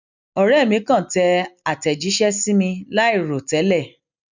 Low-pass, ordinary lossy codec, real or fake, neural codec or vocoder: 7.2 kHz; none; real; none